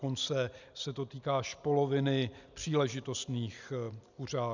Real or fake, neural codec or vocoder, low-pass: real; none; 7.2 kHz